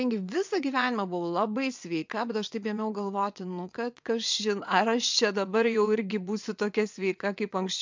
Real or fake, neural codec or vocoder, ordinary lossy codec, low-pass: fake; vocoder, 22.05 kHz, 80 mel bands, WaveNeXt; MP3, 64 kbps; 7.2 kHz